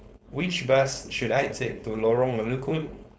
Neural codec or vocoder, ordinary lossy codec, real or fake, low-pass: codec, 16 kHz, 4.8 kbps, FACodec; none; fake; none